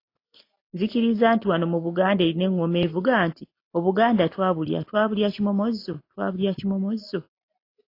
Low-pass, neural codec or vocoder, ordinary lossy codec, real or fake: 5.4 kHz; none; AAC, 32 kbps; real